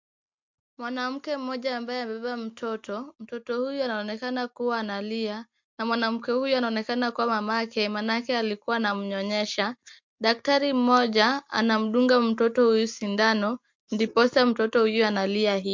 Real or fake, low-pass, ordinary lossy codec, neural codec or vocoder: real; 7.2 kHz; MP3, 48 kbps; none